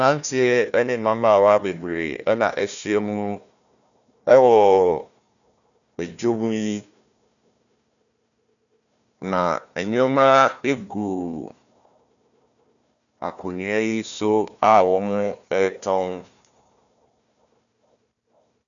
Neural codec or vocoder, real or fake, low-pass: codec, 16 kHz, 1 kbps, FunCodec, trained on Chinese and English, 50 frames a second; fake; 7.2 kHz